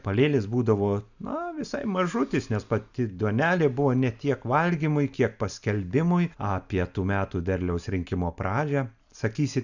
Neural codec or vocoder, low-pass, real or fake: none; 7.2 kHz; real